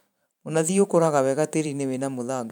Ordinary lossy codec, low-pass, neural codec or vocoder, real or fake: none; none; vocoder, 44.1 kHz, 128 mel bands every 512 samples, BigVGAN v2; fake